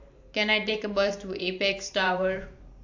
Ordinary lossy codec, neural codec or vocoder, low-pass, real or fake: AAC, 48 kbps; vocoder, 44.1 kHz, 128 mel bands every 512 samples, BigVGAN v2; 7.2 kHz; fake